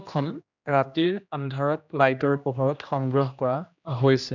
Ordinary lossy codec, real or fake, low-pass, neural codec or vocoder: none; fake; 7.2 kHz; codec, 16 kHz, 1 kbps, X-Codec, HuBERT features, trained on general audio